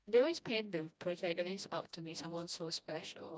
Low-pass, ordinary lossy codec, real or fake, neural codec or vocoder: none; none; fake; codec, 16 kHz, 1 kbps, FreqCodec, smaller model